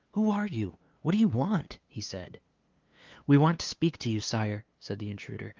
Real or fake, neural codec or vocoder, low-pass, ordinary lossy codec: fake; codec, 16 kHz, 2 kbps, FunCodec, trained on LibriTTS, 25 frames a second; 7.2 kHz; Opus, 32 kbps